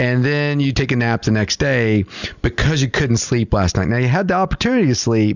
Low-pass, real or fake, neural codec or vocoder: 7.2 kHz; real; none